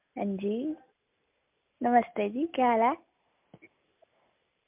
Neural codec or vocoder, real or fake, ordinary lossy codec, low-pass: none; real; MP3, 32 kbps; 3.6 kHz